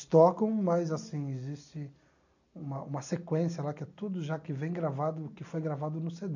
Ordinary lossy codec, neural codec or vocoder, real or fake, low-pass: none; none; real; 7.2 kHz